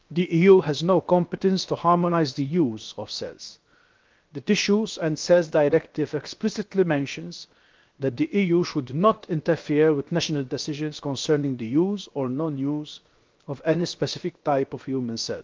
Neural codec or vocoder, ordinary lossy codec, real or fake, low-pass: codec, 16 kHz, 0.7 kbps, FocalCodec; Opus, 32 kbps; fake; 7.2 kHz